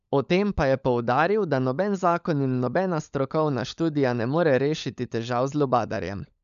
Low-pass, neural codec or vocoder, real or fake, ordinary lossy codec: 7.2 kHz; codec, 16 kHz, 16 kbps, FunCodec, trained on LibriTTS, 50 frames a second; fake; none